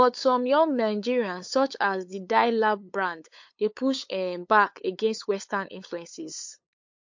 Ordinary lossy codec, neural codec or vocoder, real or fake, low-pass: MP3, 48 kbps; codec, 16 kHz, 8 kbps, FunCodec, trained on LibriTTS, 25 frames a second; fake; 7.2 kHz